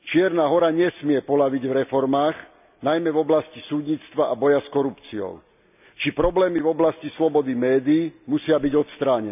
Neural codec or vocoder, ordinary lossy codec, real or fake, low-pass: none; none; real; 3.6 kHz